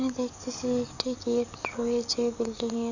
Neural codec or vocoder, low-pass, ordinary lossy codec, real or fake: codec, 44.1 kHz, 7.8 kbps, DAC; 7.2 kHz; none; fake